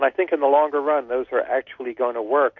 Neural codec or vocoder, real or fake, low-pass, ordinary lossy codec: none; real; 7.2 kHz; MP3, 48 kbps